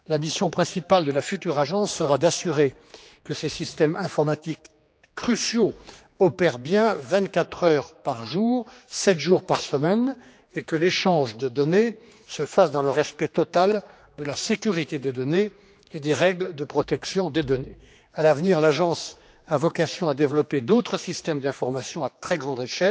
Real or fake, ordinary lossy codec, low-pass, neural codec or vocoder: fake; none; none; codec, 16 kHz, 2 kbps, X-Codec, HuBERT features, trained on general audio